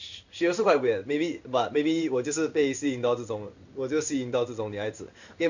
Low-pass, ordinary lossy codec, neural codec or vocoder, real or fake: 7.2 kHz; none; codec, 16 kHz in and 24 kHz out, 1 kbps, XY-Tokenizer; fake